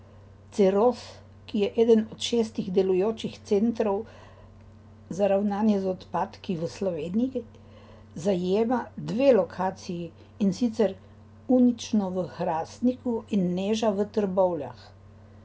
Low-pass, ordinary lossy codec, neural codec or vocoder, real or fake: none; none; none; real